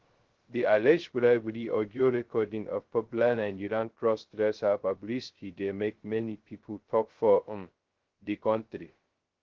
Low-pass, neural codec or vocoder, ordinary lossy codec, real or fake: 7.2 kHz; codec, 16 kHz, 0.2 kbps, FocalCodec; Opus, 16 kbps; fake